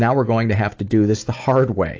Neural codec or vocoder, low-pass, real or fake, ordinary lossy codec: none; 7.2 kHz; real; AAC, 48 kbps